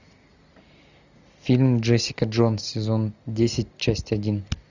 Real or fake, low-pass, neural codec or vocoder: real; 7.2 kHz; none